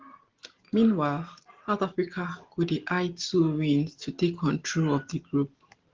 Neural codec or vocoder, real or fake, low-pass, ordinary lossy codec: none; real; 7.2 kHz; Opus, 16 kbps